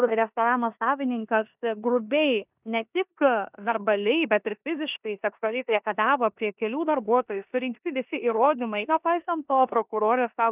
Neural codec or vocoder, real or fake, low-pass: codec, 16 kHz in and 24 kHz out, 0.9 kbps, LongCat-Audio-Codec, four codebook decoder; fake; 3.6 kHz